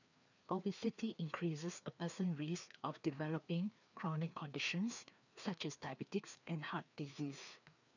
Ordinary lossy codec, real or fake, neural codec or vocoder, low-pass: none; fake; codec, 16 kHz, 2 kbps, FreqCodec, larger model; 7.2 kHz